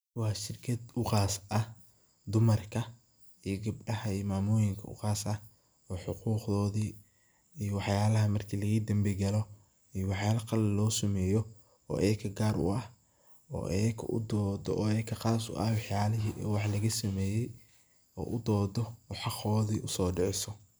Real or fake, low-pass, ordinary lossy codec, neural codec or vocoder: real; none; none; none